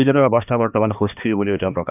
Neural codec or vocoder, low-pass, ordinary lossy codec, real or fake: codec, 16 kHz, 2 kbps, X-Codec, HuBERT features, trained on balanced general audio; 3.6 kHz; none; fake